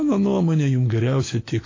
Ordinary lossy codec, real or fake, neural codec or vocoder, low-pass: AAC, 32 kbps; fake; autoencoder, 48 kHz, 128 numbers a frame, DAC-VAE, trained on Japanese speech; 7.2 kHz